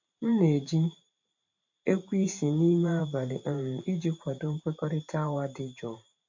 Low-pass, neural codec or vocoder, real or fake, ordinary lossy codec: 7.2 kHz; vocoder, 44.1 kHz, 128 mel bands every 512 samples, BigVGAN v2; fake; MP3, 48 kbps